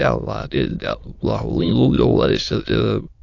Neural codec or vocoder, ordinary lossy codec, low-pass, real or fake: autoencoder, 22.05 kHz, a latent of 192 numbers a frame, VITS, trained on many speakers; AAC, 48 kbps; 7.2 kHz; fake